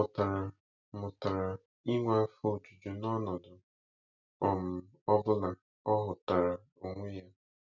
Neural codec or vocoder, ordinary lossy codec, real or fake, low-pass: none; none; real; 7.2 kHz